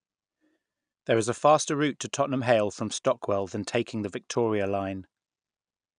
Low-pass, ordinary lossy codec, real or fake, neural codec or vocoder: 9.9 kHz; none; real; none